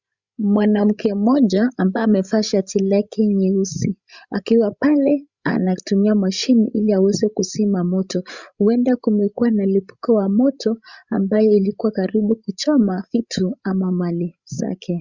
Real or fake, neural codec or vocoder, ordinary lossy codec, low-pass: fake; codec, 16 kHz, 16 kbps, FreqCodec, larger model; Opus, 64 kbps; 7.2 kHz